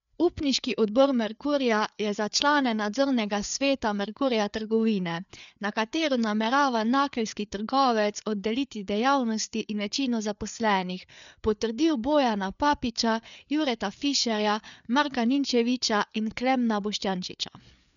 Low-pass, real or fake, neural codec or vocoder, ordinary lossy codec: 7.2 kHz; fake; codec, 16 kHz, 4 kbps, FreqCodec, larger model; none